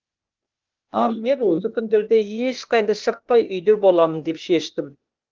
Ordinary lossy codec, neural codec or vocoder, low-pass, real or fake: Opus, 32 kbps; codec, 16 kHz, 0.8 kbps, ZipCodec; 7.2 kHz; fake